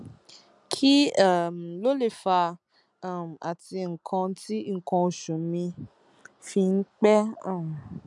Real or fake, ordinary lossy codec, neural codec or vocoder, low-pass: real; none; none; 10.8 kHz